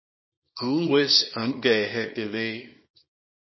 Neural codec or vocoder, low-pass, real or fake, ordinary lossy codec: codec, 24 kHz, 0.9 kbps, WavTokenizer, small release; 7.2 kHz; fake; MP3, 24 kbps